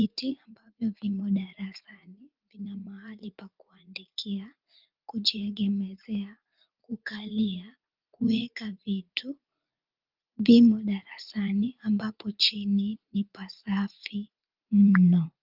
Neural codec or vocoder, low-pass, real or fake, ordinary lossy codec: vocoder, 44.1 kHz, 80 mel bands, Vocos; 5.4 kHz; fake; Opus, 24 kbps